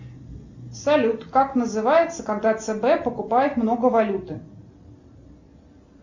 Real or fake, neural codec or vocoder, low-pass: real; none; 7.2 kHz